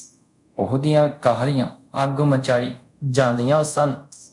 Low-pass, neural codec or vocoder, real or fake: 10.8 kHz; codec, 24 kHz, 0.5 kbps, DualCodec; fake